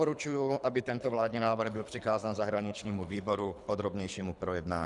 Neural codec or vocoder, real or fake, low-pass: codec, 24 kHz, 3 kbps, HILCodec; fake; 10.8 kHz